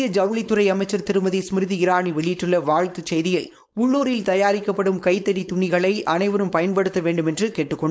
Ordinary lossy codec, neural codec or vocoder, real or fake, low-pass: none; codec, 16 kHz, 4.8 kbps, FACodec; fake; none